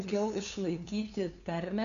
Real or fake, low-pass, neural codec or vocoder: fake; 7.2 kHz; codec, 16 kHz, 2 kbps, FunCodec, trained on LibriTTS, 25 frames a second